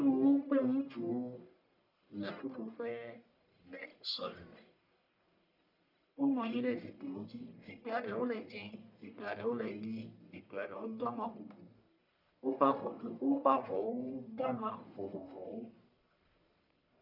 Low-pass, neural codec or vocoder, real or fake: 5.4 kHz; codec, 44.1 kHz, 1.7 kbps, Pupu-Codec; fake